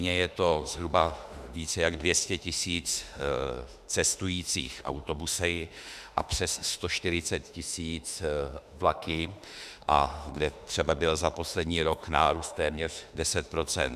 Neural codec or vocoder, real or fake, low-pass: autoencoder, 48 kHz, 32 numbers a frame, DAC-VAE, trained on Japanese speech; fake; 14.4 kHz